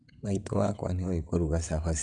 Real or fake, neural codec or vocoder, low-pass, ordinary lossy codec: fake; vocoder, 22.05 kHz, 80 mel bands, WaveNeXt; 9.9 kHz; none